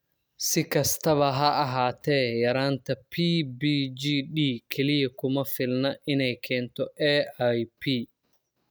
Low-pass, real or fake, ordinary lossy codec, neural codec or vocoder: none; real; none; none